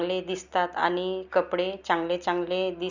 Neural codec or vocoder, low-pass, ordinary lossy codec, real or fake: none; 7.2 kHz; Opus, 64 kbps; real